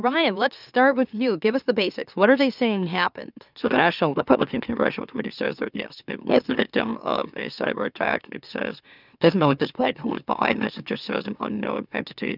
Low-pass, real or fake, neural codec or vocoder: 5.4 kHz; fake; autoencoder, 44.1 kHz, a latent of 192 numbers a frame, MeloTTS